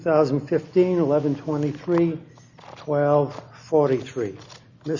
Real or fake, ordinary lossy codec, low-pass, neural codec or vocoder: real; Opus, 64 kbps; 7.2 kHz; none